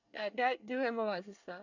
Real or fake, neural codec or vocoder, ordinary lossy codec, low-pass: fake; codec, 24 kHz, 1 kbps, SNAC; none; 7.2 kHz